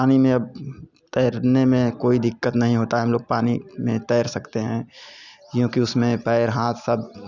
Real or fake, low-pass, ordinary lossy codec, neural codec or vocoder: real; 7.2 kHz; none; none